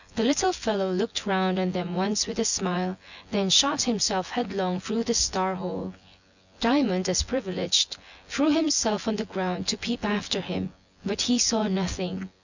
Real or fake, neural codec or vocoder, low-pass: fake; vocoder, 24 kHz, 100 mel bands, Vocos; 7.2 kHz